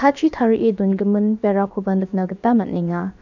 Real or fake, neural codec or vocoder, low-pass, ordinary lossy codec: fake; codec, 16 kHz, about 1 kbps, DyCAST, with the encoder's durations; 7.2 kHz; none